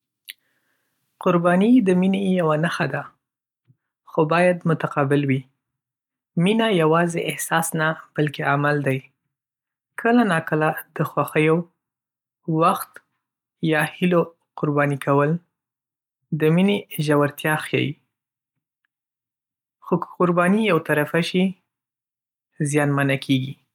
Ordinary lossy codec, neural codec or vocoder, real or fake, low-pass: none; none; real; 19.8 kHz